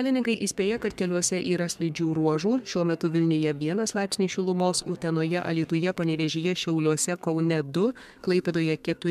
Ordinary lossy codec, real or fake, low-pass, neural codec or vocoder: MP3, 96 kbps; fake; 14.4 kHz; codec, 32 kHz, 1.9 kbps, SNAC